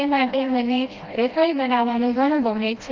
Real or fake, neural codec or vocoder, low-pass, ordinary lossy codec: fake; codec, 16 kHz, 1 kbps, FreqCodec, smaller model; 7.2 kHz; Opus, 32 kbps